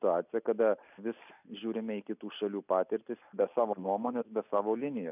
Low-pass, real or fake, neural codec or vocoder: 3.6 kHz; real; none